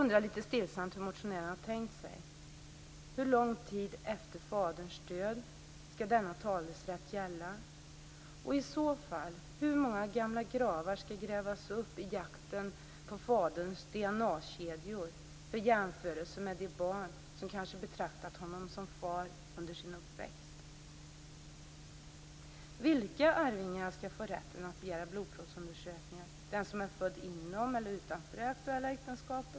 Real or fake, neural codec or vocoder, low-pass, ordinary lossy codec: real; none; none; none